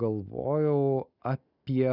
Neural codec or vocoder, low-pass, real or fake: none; 5.4 kHz; real